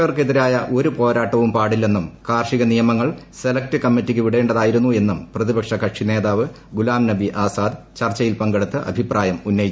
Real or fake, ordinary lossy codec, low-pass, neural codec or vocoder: real; none; none; none